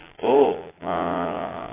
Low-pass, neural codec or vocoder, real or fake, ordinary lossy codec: 3.6 kHz; vocoder, 22.05 kHz, 80 mel bands, Vocos; fake; AAC, 16 kbps